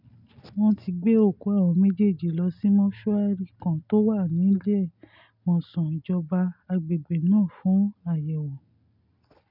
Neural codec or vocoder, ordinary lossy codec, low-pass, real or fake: none; none; 5.4 kHz; real